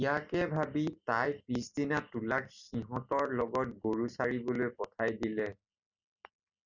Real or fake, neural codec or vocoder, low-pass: real; none; 7.2 kHz